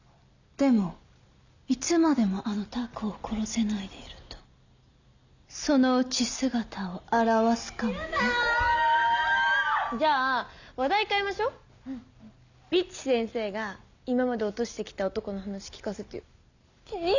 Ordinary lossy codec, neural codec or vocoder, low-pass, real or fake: none; none; 7.2 kHz; real